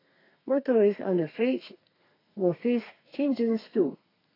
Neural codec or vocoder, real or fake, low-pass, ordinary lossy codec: codec, 32 kHz, 1.9 kbps, SNAC; fake; 5.4 kHz; AAC, 24 kbps